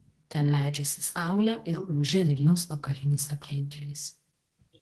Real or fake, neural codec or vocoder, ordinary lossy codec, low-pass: fake; codec, 24 kHz, 0.9 kbps, WavTokenizer, medium music audio release; Opus, 16 kbps; 10.8 kHz